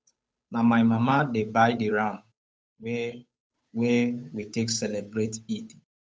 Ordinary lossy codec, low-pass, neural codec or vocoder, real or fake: none; none; codec, 16 kHz, 8 kbps, FunCodec, trained on Chinese and English, 25 frames a second; fake